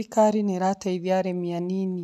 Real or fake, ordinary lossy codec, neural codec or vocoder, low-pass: real; none; none; 14.4 kHz